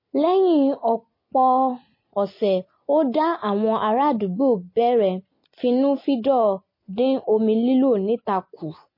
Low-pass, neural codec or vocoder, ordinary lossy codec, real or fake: 5.4 kHz; none; MP3, 24 kbps; real